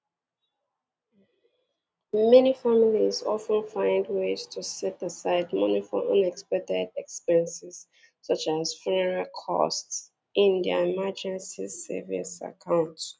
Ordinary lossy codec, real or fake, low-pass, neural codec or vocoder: none; real; none; none